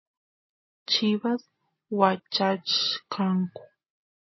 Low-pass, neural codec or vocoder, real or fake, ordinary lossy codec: 7.2 kHz; none; real; MP3, 24 kbps